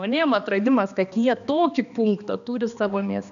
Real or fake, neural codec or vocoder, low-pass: fake; codec, 16 kHz, 2 kbps, X-Codec, HuBERT features, trained on balanced general audio; 7.2 kHz